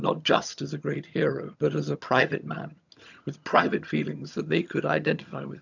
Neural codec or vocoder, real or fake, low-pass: vocoder, 22.05 kHz, 80 mel bands, HiFi-GAN; fake; 7.2 kHz